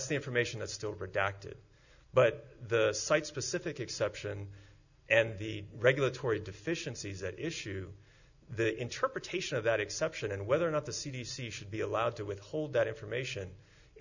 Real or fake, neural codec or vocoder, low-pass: real; none; 7.2 kHz